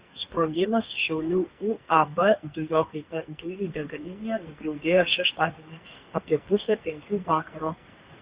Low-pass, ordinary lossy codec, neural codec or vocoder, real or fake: 3.6 kHz; Opus, 64 kbps; codec, 44.1 kHz, 2.6 kbps, SNAC; fake